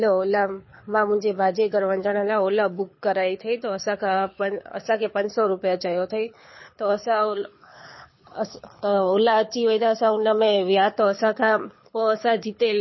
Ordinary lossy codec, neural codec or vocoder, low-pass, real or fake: MP3, 24 kbps; codec, 24 kHz, 6 kbps, HILCodec; 7.2 kHz; fake